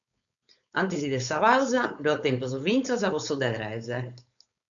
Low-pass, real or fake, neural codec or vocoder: 7.2 kHz; fake; codec, 16 kHz, 4.8 kbps, FACodec